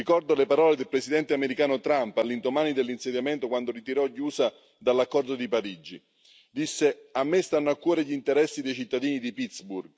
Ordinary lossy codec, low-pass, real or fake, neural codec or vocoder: none; none; real; none